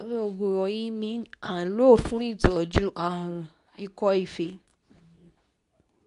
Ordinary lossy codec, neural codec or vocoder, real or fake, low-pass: none; codec, 24 kHz, 0.9 kbps, WavTokenizer, medium speech release version 2; fake; 10.8 kHz